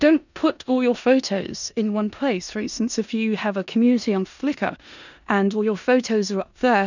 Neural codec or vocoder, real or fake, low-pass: codec, 16 kHz in and 24 kHz out, 0.9 kbps, LongCat-Audio-Codec, four codebook decoder; fake; 7.2 kHz